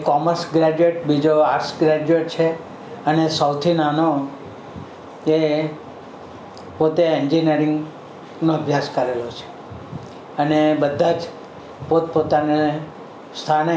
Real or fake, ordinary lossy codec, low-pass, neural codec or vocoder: real; none; none; none